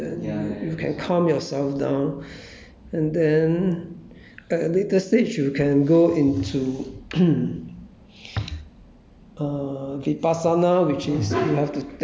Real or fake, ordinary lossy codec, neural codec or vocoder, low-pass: real; none; none; none